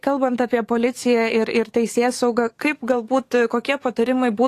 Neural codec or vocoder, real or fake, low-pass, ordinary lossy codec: codec, 44.1 kHz, 7.8 kbps, DAC; fake; 14.4 kHz; AAC, 48 kbps